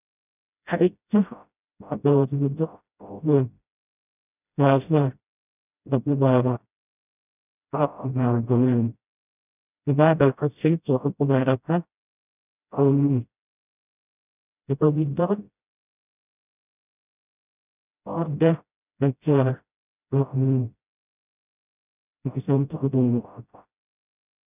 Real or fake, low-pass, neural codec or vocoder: fake; 3.6 kHz; codec, 16 kHz, 0.5 kbps, FreqCodec, smaller model